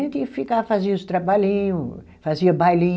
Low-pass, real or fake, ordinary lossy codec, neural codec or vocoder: none; real; none; none